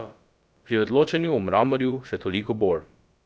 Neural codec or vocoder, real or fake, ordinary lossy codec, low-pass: codec, 16 kHz, about 1 kbps, DyCAST, with the encoder's durations; fake; none; none